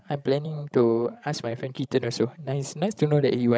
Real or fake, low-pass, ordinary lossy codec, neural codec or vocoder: fake; none; none; codec, 16 kHz, 16 kbps, FreqCodec, larger model